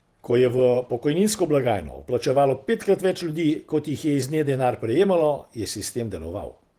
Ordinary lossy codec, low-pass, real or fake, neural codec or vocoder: Opus, 24 kbps; 14.4 kHz; fake; vocoder, 48 kHz, 128 mel bands, Vocos